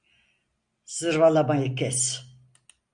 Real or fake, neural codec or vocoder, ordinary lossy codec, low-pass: real; none; MP3, 96 kbps; 9.9 kHz